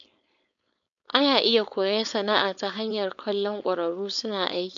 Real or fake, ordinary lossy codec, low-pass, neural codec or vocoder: fake; MP3, 64 kbps; 7.2 kHz; codec, 16 kHz, 4.8 kbps, FACodec